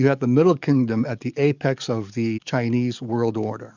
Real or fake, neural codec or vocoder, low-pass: fake; codec, 44.1 kHz, 7.8 kbps, DAC; 7.2 kHz